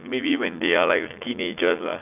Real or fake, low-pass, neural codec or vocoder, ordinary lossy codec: fake; 3.6 kHz; vocoder, 44.1 kHz, 80 mel bands, Vocos; none